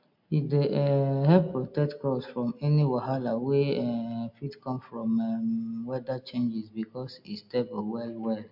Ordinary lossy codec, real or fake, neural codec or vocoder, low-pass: MP3, 48 kbps; real; none; 5.4 kHz